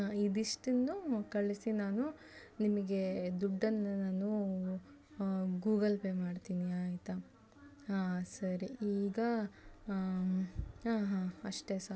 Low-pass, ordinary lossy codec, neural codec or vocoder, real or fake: none; none; none; real